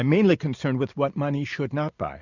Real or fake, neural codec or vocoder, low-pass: real; none; 7.2 kHz